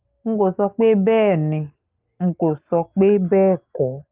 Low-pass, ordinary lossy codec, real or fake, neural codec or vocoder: 3.6 kHz; Opus, 32 kbps; real; none